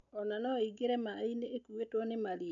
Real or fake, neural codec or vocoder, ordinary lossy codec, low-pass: real; none; MP3, 96 kbps; 7.2 kHz